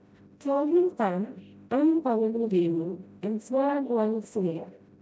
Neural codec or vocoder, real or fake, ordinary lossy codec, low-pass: codec, 16 kHz, 0.5 kbps, FreqCodec, smaller model; fake; none; none